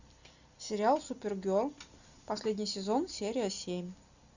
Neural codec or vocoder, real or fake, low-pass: none; real; 7.2 kHz